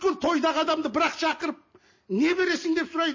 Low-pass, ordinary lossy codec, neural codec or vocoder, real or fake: 7.2 kHz; MP3, 32 kbps; none; real